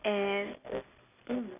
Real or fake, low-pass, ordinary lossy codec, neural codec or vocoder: real; 3.6 kHz; none; none